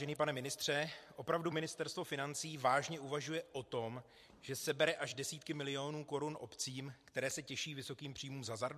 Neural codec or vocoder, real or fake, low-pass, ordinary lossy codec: vocoder, 44.1 kHz, 128 mel bands every 256 samples, BigVGAN v2; fake; 14.4 kHz; MP3, 64 kbps